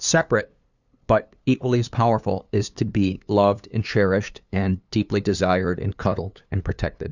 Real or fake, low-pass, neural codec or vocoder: fake; 7.2 kHz; codec, 16 kHz, 2 kbps, FunCodec, trained on LibriTTS, 25 frames a second